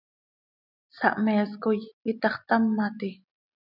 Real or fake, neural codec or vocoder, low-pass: real; none; 5.4 kHz